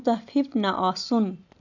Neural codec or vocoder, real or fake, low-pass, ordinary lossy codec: none; real; 7.2 kHz; none